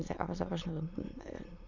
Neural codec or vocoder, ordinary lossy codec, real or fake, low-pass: autoencoder, 22.05 kHz, a latent of 192 numbers a frame, VITS, trained on many speakers; none; fake; 7.2 kHz